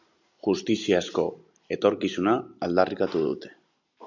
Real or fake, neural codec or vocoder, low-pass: real; none; 7.2 kHz